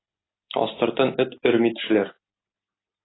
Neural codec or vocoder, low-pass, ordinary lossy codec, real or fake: none; 7.2 kHz; AAC, 16 kbps; real